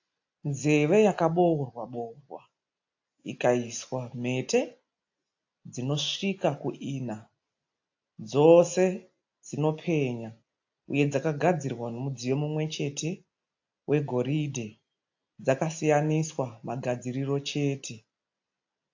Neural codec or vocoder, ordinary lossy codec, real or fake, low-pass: none; AAC, 48 kbps; real; 7.2 kHz